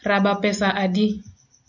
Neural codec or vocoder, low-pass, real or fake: none; 7.2 kHz; real